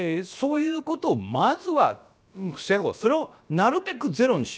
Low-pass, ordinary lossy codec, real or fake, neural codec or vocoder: none; none; fake; codec, 16 kHz, about 1 kbps, DyCAST, with the encoder's durations